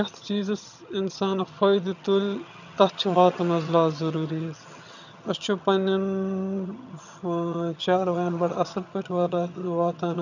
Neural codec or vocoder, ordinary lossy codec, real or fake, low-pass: vocoder, 22.05 kHz, 80 mel bands, HiFi-GAN; none; fake; 7.2 kHz